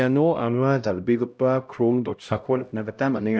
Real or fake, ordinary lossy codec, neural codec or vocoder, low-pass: fake; none; codec, 16 kHz, 0.5 kbps, X-Codec, HuBERT features, trained on LibriSpeech; none